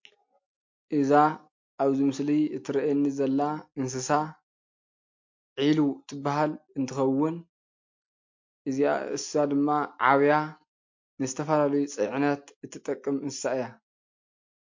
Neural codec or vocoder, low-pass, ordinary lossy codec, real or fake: none; 7.2 kHz; MP3, 48 kbps; real